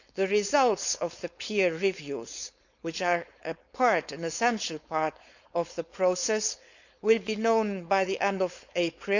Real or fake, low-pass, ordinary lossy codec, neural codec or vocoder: fake; 7.2 kHz; none; codec, 16 kHz, 4.8 kbps, FACodec